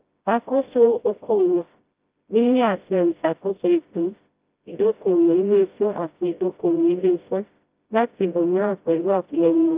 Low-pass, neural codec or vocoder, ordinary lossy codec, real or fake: 3.6 kHz; codec, 16 kHz, 0.5 kbps, FreqCodec, smaller model; Opus, 24 kbps; fake